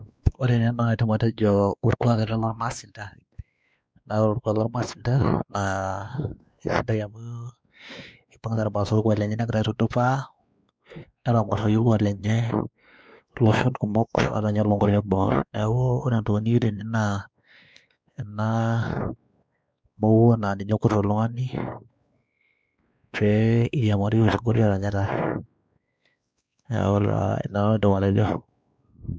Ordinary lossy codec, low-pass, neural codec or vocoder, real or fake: none; none; codec, 16 kHz, 2 kbps, X-Codec, WavLM features, trained on Multilingual LibriSpeech; fake